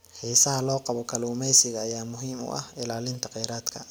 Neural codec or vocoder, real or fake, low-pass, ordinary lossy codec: none; real; none; none